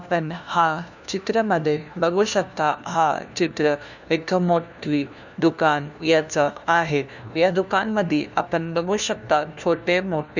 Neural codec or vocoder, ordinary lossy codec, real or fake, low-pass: codec, 16 kHz, 1 kbps, FunCodec, trained on LibriTTS, 50 frames a second; none; fake; 7.2 kHz